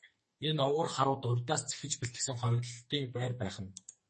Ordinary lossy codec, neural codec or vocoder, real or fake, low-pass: MP3, 32 kbps; codec, 44.1 kHz, 2.6 kbps, SNAC; fake; 10.8 kHz